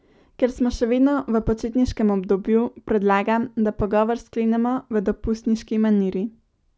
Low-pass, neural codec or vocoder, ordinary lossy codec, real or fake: none; none; none; real